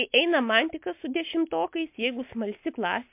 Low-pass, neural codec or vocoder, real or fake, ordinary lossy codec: 3.6 kHz; none; real; MP3, 32 kbps